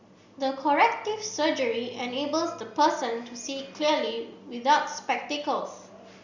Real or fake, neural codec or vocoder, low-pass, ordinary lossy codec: real; none; 7.2 kHz; Opus, 64 kbps